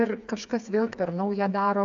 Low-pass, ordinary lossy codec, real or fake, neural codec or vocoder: 7.2 kHz; AAC, 64 kbps; fake; codec, 16 kHz, 4 kbps, FreqCodec, smaller model